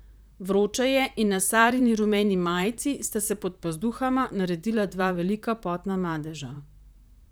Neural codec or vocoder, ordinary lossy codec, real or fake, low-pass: vocoder, 44.1 kHz, 128 mel bands, Pupu-Vocoder; none; fake; none